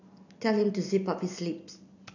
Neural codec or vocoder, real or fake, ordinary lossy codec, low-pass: none; real; none; 7.2 kHz